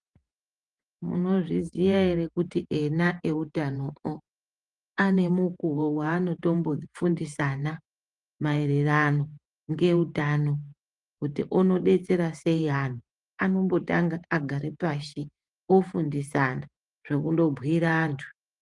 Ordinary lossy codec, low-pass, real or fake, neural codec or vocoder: Opus, 32 kbps; 10.8 kHz; fake; vocoder, 48 kHz, 128 mel bands, Vocos